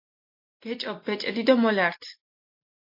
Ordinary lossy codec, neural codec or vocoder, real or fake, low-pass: MP3, 32 kbps; none; real; 5.4 kHz